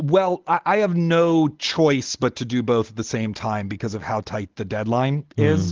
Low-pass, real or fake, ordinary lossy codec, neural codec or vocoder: 7.2 kHz; real; Opus, 16 kbps; none